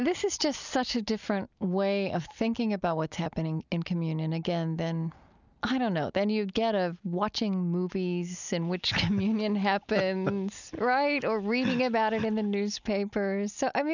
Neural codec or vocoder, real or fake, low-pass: none; real; 7.2 kHz